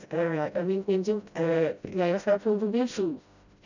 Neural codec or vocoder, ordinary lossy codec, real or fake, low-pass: codec, 16 kHz, 0.5 kbps, FreqCodec, smaller model; none; fake; 7.2 kHz